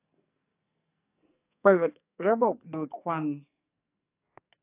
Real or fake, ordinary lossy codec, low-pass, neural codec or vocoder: fake; AAC, 24 kbps; 3.6 kHz; codec, 44.1 kHz, 2.6 kbps, SNAC